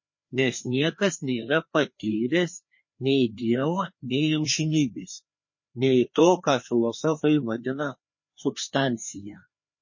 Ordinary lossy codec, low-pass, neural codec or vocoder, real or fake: MP3, 32 kbps; 7.2 kHz; codec, 16 kHz, 2 kbps, FreqCodec, larger model; fake